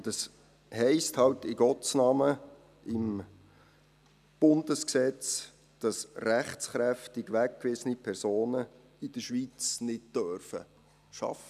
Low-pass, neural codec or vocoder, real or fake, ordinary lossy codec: 14.4 kHz; none; real; none